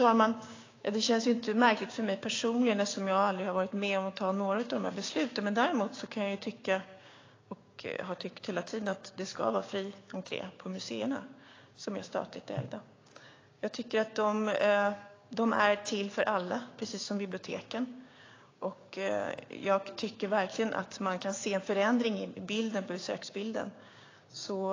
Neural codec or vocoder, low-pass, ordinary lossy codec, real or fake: codec, 16 kHz, 6 kbps, DAC; 7.2 kHz; AAC, 32 kbps; fake